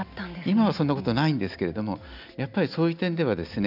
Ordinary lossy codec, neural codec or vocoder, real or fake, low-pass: none; none; real; 5.4 kHz